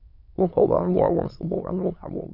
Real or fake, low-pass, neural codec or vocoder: fake; 5.4 kHz; autoencoder, 22.05 kHz, a latent of 192 numbers a frame, VITS, trained on many speakers